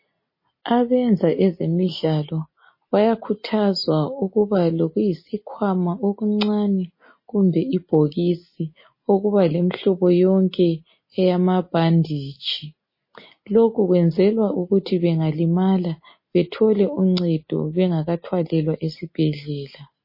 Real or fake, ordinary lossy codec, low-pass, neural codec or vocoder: real; MP3, 24 kbps; 5.4 kHz; none